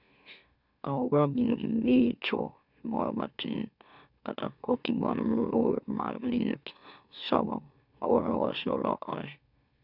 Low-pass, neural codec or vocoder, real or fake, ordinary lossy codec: 5.4 kHz; autoencoder, 44.1 kHz, a latent of 192 numbers a frame, MeloTTS; fake; none